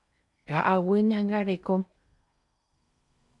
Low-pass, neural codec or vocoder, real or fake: 10.8 kHz; codec, 16 kHz in and 24 kHz out, 0.8 kbps, FocalCodec, streaming, 65536 codes; fake